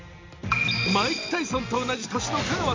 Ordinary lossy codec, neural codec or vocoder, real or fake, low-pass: MP3, 48 kbps; none; real; 7.2 kHz